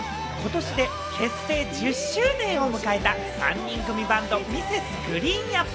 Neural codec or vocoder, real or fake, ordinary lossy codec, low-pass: none; real; none; none